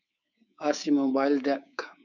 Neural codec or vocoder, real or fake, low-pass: codec, 24 kHz, 3.1 kbps, DualCodec; fake; 7.2 kHz